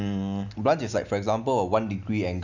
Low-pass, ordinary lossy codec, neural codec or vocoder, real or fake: 7.2 kHz; none; none; real